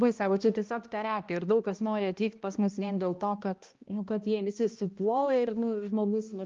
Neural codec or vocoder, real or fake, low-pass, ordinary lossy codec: codec, 16 kHz, 1 kbps, X-Codec, HuBERT features, trained on balanced general audio; fake; 7.2 kHz; Opus, 32 kbps